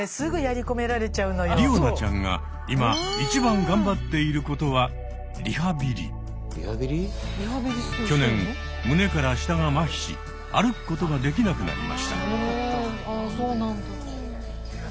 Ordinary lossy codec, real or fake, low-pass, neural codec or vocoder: none; real; none; none